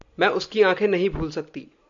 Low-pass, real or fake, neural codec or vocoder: 7.2 kHz; real; none